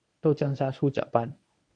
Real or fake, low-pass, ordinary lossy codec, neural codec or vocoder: fake; 9.9 kHz; AAC, 64 kbps; codec, 24 kHz, 0.9 kbps, WavTokenizer, medium speech release version 1